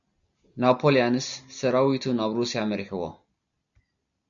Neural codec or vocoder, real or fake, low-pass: none; real; 7.2 kHz